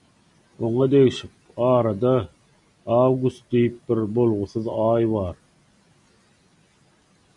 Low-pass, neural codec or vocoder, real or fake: 10.8 kHz; none; real